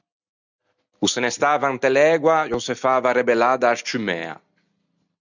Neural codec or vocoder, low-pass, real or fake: none; 7.2 kHz; real